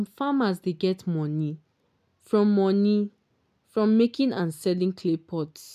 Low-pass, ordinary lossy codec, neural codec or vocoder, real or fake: 14.4 kHz; none; none; real